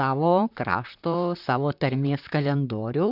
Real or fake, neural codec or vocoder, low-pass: fake; vocoder, 44.1 kHz, 128 mel bands, Pupu-Vocoder; 5.4 kHz